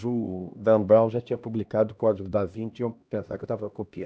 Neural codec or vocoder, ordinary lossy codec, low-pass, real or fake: codec, 16 kHz, 1 kbps, X-Codec, HuBERT features, trained on LibriSpeech; none; none; fake